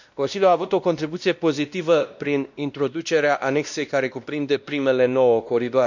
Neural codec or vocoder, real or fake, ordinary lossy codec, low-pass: codec, 16 kHz, 1 kbps, X-Codec, WavLM features, trained on Multilingual LibriSpeech; fake; none; 7.2 kHz